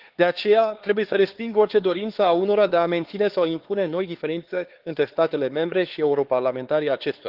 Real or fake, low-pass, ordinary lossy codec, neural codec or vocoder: fake; 5.4 kHz; Opus, 16 kbps; codec, 16 kHz, 4 kbps, X-Codec, HuBERT features, trained on LibriSpeech